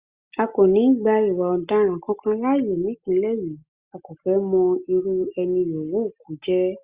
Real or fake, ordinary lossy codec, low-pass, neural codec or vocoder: real; Opus, 64 kbps; 3.6 kHz; none